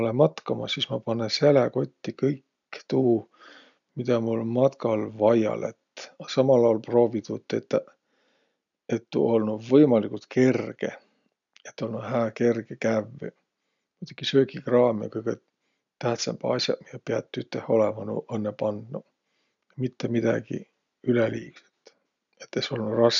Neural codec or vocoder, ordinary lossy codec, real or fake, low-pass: none; none; real; 7.2 kHz